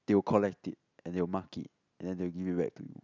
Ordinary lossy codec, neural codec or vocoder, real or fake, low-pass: none; none; real; 7.2 kHz